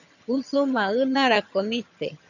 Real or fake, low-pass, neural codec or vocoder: fake; 7.2 kHz; vocoder, 22.05 kHz, 80 mel bands, HiFi-GAN